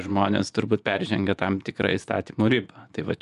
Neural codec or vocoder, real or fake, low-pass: vocoder, 24 kHz, 100 mel bands, Vocos; fake; 10.8 kHz